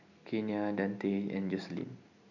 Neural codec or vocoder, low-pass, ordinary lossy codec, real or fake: none; 7.2 kHz; none; real